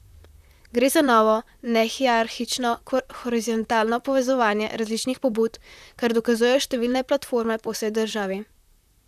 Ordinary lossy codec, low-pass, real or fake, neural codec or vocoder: none; 14.4 kHz; fake; vocoder, 44.1 kHz, 128 mel bands, Pupu-Vocoder